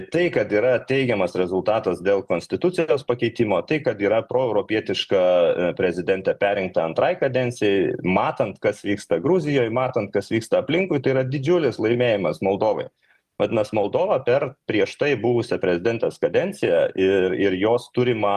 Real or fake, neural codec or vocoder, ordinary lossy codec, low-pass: real; none; Opus, 32 kbps; 14.4 kHz